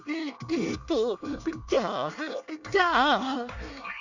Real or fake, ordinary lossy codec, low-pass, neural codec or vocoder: fake; none; 7.2 kHz; codec, 24 kHz, 1 kbps, SNAC